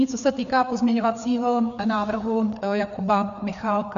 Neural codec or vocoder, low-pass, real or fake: codec, 16 kHz, 4 kbps, FreqCodec, larger model; 7.2 kHz; fake